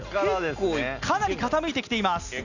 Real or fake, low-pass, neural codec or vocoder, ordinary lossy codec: real; 7.2 kHz; none; none